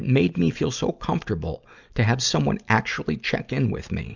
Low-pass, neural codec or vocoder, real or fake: 7.2 kHz; none; real